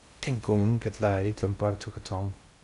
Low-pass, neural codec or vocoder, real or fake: 10.8 kHz; codec, 16 kHz in and 24 kHz out, 0.6 kbps, FocalCodec, streaming, 2048 codes; fake